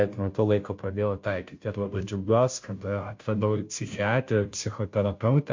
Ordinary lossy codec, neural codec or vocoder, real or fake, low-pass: MP3, 48 kbps; codec, 16 kHz, 0.5 kbps, FunCodec, trained on Chinese and English, 25 frames a second; fake; 7.2 kHz